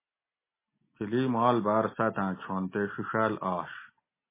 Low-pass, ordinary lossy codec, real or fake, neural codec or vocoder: 3.6 kHz; MP3, 16 kbps; real; none